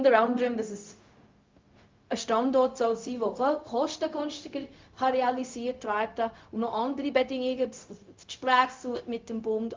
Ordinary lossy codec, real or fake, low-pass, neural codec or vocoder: Opus, 32 kbps; fake; 7.2 kHz; codec, 16 kHz, 0.4 kbps, LongCat-Audio-Codec